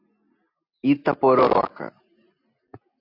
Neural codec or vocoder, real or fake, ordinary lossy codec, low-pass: none; real; AAC, 32 kbps; 5.4 kHz